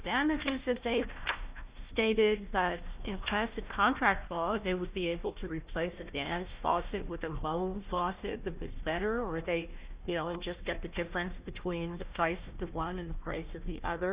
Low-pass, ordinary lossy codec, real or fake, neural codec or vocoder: 3.6 kHz; Opus, 64 kbps; fake; codec, 16 kHz, 1 kbps, FunCodec, trained on Chinese and English, 50 frames a second